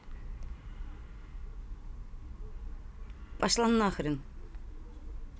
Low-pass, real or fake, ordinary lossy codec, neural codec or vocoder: none; real; none; none